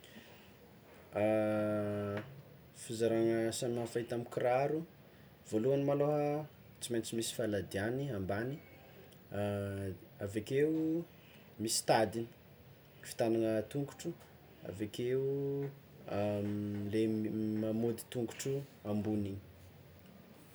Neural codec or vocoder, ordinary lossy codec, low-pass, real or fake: none; none; none; real